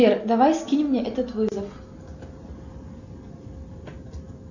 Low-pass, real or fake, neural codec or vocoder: 7.2 kHz; real; none